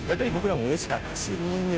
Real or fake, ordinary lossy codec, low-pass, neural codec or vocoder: fake; none; none; codec, 16 kHz, 0.5 kbps, FunCodec, trained on Chinese and English, 25 frames a second